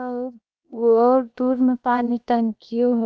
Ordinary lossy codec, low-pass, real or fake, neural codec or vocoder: none; none; fake; codec, 16 kHz, 0.7 kbps, FocalCodec